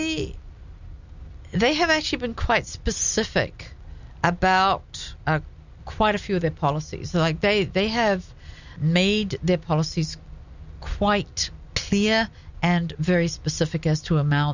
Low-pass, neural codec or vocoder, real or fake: 7.2 kHz; none; real